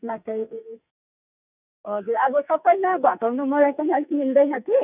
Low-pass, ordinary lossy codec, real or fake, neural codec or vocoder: 3.6 kHz; none; fake; codec, 44.1 kHz, 2.6 kbps, SNAC